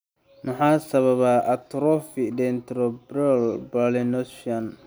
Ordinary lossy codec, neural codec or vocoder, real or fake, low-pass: none; none; real; none